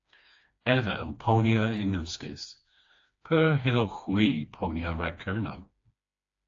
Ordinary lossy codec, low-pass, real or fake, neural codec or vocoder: AAC, 48 kbps; 7.2 kHz; fake; codec, 16 kHz, 2 kbps, FreqCodec, smaller model